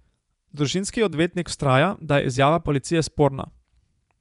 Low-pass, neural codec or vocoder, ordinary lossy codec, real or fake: 10.8 kHz; vocoder, 24 kHz, 100 mel bands, Vocos; none; fake